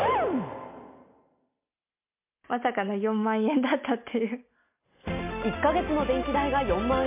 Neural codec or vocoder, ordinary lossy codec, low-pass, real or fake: none; MP3, 32 kbps; 3.6 kHz; real